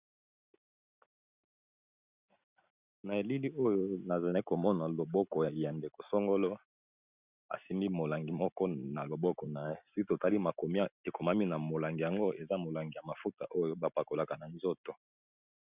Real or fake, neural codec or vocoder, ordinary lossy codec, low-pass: real; none; Opus, 64 kbps; 3.6 kHz